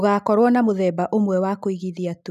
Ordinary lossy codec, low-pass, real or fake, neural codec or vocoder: none; 14.4 kHz; real; none